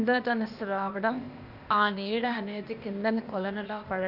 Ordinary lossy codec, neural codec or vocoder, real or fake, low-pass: none; codec, 16 kHz, 0.8 kbps, ZipCodec; fake; 5.4 kHz